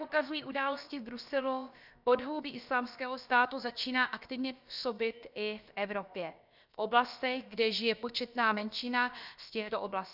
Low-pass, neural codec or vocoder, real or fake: 5.4 kHz; codec, 16 kHz, about 1 kbps, DyCAST, with the encoder's durations; fake